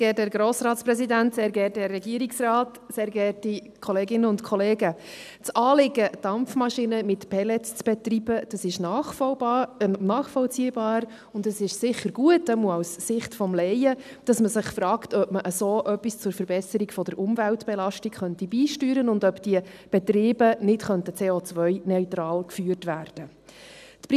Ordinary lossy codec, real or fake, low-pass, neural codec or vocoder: none; real; 14.4 kHz; none